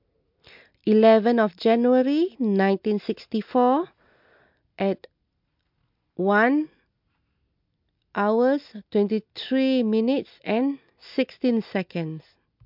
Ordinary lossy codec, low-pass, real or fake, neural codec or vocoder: MP3, 48 kbps; 5.4 kHz; real; none